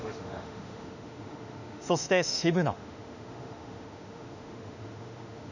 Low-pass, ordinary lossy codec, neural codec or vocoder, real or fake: 7.2 kHz; none; autoencoder, 48 kHz, 32 numbers a frame, DAC-VAE, trained on Japanese speech; fake